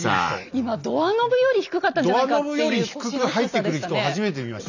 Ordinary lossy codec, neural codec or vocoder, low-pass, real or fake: none; none; 7.2 kHz; real